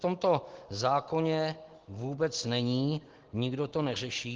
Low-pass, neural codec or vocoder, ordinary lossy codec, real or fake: 7.2 kHz; none; Opus, 16 kbps; real